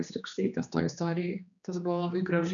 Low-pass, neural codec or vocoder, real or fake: 7.2 kHz; codec, 16 kHz, 2 kbps, X-Codec, HuBERT features, trained on general audio; fake